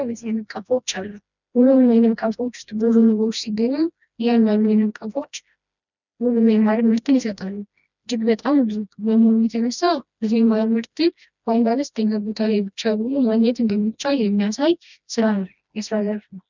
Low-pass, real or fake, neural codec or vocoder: 7.2 kHz; fake; codec, 16 kHz, 1 kbps, FreqCodec, smaller model